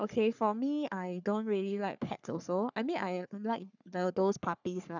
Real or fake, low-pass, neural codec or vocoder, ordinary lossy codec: fake; 7.2 kHz; codec, 44.1 kHz, 3.4 kbps, Pupu-Codec; none